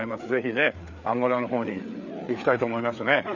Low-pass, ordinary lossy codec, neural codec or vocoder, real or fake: 7.2 kHz; none; codec, 16 kHz, 4 kbps, FreqCodec, larger model; fake